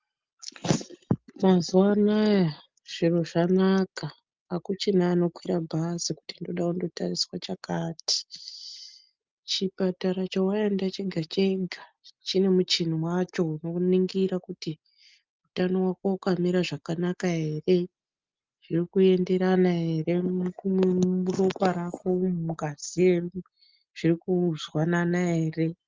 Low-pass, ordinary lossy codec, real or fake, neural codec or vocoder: 7.2 kHz; Opus, 24 kbps; real; none